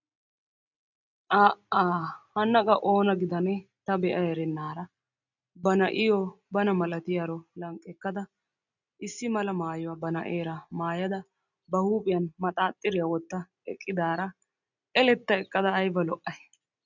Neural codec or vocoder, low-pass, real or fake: none; 7.2 kHz; real